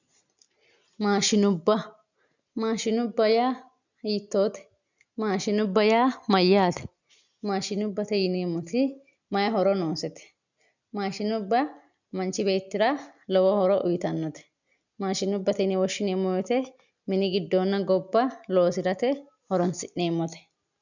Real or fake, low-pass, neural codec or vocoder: real; 7.2 kHz; none